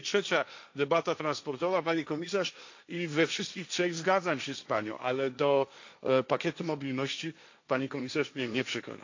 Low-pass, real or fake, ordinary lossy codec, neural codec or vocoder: 7.2 kHz; fake; AAC, 48 kbps; codec, 16 kHz, 1.1 kbps, Voila-Tokenizer